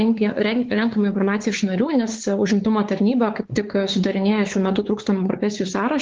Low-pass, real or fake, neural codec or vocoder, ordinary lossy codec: 7.2 kHz; fake; codec, 16 kHz, 4 kbps, X-Codec, WavLM features, trained on Multilingual LibriSpeech; Opus, 16 kbps